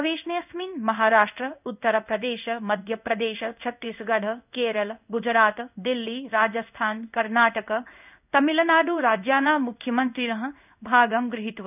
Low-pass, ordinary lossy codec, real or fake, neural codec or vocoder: 3.6 kHz; none; fake; codec, 16 kHz in and 24 kHz out, 1 kbps, XY-Tokenizer